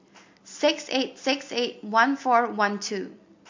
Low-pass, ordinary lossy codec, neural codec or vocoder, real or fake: 7.2 kHz; MP3, 48 kbps; none; real